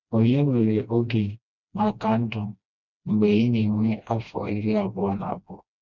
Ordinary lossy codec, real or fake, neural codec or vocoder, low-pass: none; fake; codec, 16 kHz, 1 kbps, FreqCodec, smaller model; 7.2 kHz